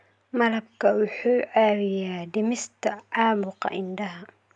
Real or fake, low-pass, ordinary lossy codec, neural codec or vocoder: real; 9.9 kHz; MP3, 96 kbps; none